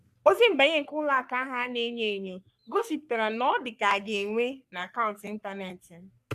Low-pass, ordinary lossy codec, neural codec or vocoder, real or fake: 14.4 kHz; none; codec, 44.1 kHz, 3.4 kbps, Pupu-Codec; fake